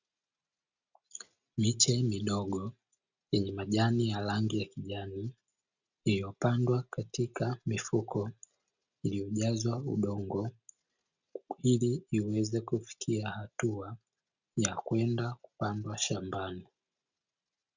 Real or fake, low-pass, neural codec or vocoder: real; 7.2 kHz; none